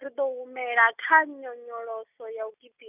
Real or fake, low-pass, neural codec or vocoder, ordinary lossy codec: real; 3.6 kHz; none; none